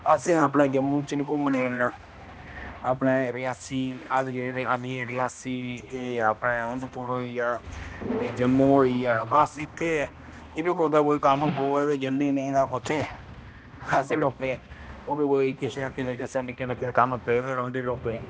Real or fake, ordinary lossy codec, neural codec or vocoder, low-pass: fake; none; codec, 16 kHz, 1 kbps, X-Codec, HuBERT features, trained on balanced general audio; none